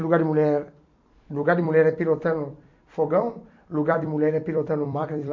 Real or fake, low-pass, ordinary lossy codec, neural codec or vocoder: fake; 7.2 kHz; MP3, 48 kbps; vocoder, 44.1 kHz, 128 mel bands every 256 samples, BigVGAN v2